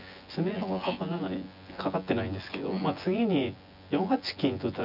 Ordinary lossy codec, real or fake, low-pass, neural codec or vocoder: none; fake; 5.4 kHz; vocoder, 24 kHz, 100 mel bands, Vocos